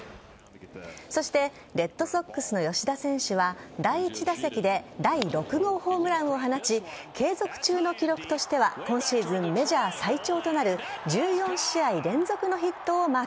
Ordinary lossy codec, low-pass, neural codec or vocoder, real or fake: none; none; none; real